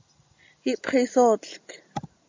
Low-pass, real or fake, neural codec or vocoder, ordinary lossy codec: 7.2 kHz; real; none; MP3, 64 kbps